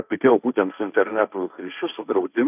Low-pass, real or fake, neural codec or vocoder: 3.6 kHz; fake; codec, 16 kHz, 1.1 kbps, Voila-Tokenizer